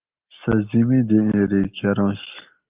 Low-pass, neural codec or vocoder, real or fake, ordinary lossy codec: 3.6 kHz; none; real; Opus, 32 kbps